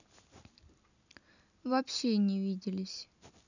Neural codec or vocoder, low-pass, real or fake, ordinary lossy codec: none; 7.2 kHz; real; none